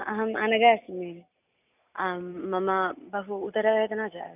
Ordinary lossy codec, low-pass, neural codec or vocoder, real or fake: none; 3.6 kHz; none; real